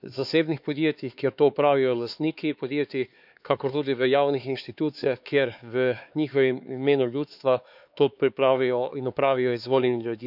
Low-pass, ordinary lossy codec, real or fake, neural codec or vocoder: 5.4 kHz; none; fake; codec, 16 kHz, 4 kbps, X-Codec, HuBERT features, trained on LibriSpeech